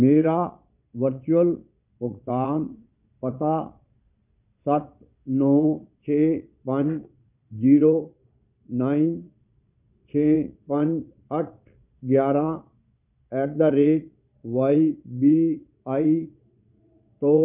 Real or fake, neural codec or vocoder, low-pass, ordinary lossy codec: fake; vocoder, 22.05 kHz, 80 mel bands, Vocos; 3.6 kHz; none